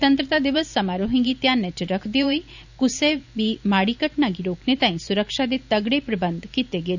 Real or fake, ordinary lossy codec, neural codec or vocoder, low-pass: fake; none; vocoder, 44.1 kHz, 128 mel bands every 512 samples, BigVGAN v2; 7.2 kHz